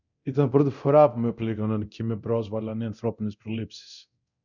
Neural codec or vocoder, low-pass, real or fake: codec, 24 kHz, 0.9 kbps, DualCodec; 7.2 kHz; fake